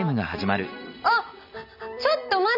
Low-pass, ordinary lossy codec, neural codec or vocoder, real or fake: 5.4 kHz; none; none; real